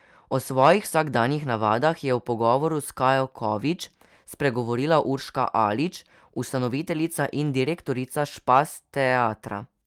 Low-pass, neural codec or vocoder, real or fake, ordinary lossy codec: 19.8 kHz; none; real; Opus, 32 kbps